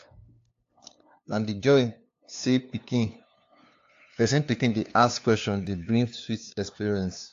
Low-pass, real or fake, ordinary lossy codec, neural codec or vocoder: 7.2 kHz; fake; AAC, 48 kbps; codec, 16 kHz, 2 kbps, FunCodec, trained on LibriTTS, 25 frames a second